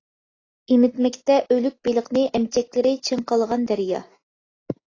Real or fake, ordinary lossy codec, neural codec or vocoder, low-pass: real; AAC, 32 kbps; none; 7.2 kHz